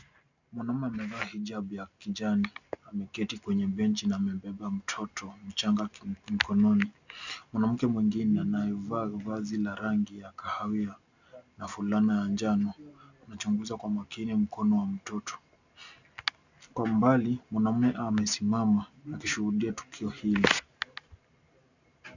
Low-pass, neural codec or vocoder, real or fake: 7.2 kHz; none; real